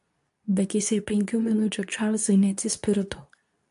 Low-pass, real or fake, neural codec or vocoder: 10.8 kHz; fake; codec, 24 kHz, 0.9 kbps, WavTokenizer, medium speech release version 2